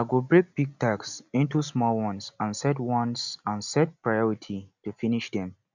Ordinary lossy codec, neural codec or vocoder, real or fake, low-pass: none; none; real; 7.2 kHz